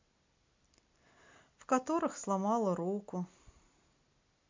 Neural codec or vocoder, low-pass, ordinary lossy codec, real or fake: none; 7.2 kHz; MP3, 48 kbps; real